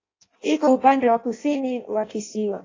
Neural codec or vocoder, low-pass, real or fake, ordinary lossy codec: codec, 16 kHz in and 24 kHz out, 0.6 kbps, FireRedTTS-2 codec; 7.2 kHz; fake; AAC, 32 kbps